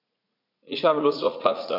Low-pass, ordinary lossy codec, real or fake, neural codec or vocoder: 5.4 kHz; none; fake; vocoder, 44.1 kHz, 80 mel bands, Vocos